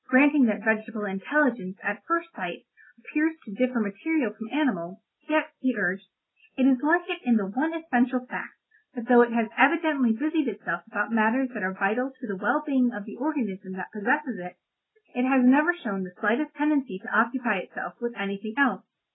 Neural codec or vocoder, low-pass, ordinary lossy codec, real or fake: none; 7.2 kHz; AAC, 16 kbps; real